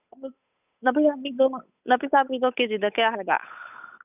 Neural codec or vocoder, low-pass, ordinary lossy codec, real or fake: codec, 16 kHz, 8 kbps, FunCodec, trained on Chinese and English, 25 frames a second; 3.6 kHz; none; fake